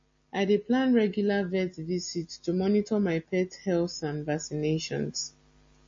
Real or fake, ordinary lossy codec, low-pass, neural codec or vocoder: real; MP3, 32 kbps; 7.2 kHz; none